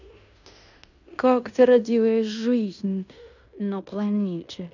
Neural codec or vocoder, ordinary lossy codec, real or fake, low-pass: codec, 16 kHz in and 24 kHz out, 0.9 kbps, LongCat-Audio-Codec, fine tuned four codebook decoder; none; fake; 7.2 kHz